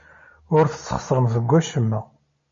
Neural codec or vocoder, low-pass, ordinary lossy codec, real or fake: none; 7.2 kHz; MP3, 32 kbps; real